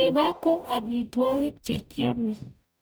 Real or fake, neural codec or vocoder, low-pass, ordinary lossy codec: fake; codec, 44.1 kHz, 0.9 kbps, DAC; none; none